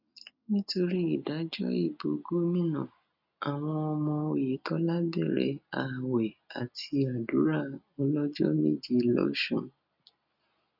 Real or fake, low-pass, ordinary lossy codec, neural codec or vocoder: real; 5.4 kHz; none; none